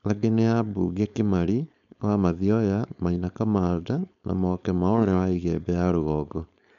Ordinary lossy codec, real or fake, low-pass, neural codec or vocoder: none; fake; 7.2 kHz; codec, 16 kHz, 4.8 kbps, FACodec